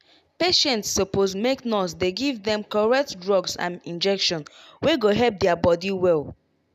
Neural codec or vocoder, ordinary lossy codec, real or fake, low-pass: none; none; real; 14.4 kHz